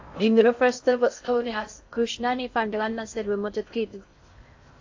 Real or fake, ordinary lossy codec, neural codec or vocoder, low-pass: fake; AAC, 48 kbps; codec, 16 kHz in and 24 kHz out, 0.6 kbps, FocalCodec, streaming, 2048 codes; 7.2 kHz